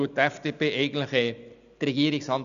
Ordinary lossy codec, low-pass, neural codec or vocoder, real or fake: none; 7.2 kHz; none; real